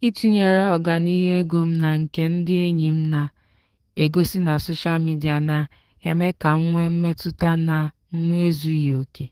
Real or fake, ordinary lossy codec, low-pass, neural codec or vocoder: fake; Opus, 24 kbps; 14.4 kHz; codec, 32 kHz, 1.9 kbps, SNAC